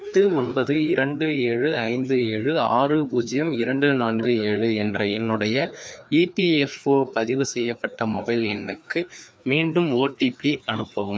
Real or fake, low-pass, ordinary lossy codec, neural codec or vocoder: fake; none; none; codec, 16 kHz, 2 kbps, FreqCodec, larger model